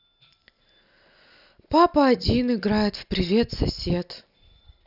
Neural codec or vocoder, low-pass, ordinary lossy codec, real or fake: none; 5.4 kHz; none; real